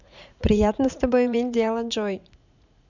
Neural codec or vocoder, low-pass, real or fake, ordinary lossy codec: vocoder, 44.1 kHz, 80 mel bands, Vocos; 7.2 kHz; fake; none